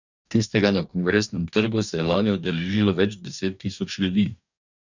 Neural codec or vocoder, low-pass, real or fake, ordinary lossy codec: codec, 44.1 kHz, 2.6 kbps, DAC; 7.2 kHz; fake; none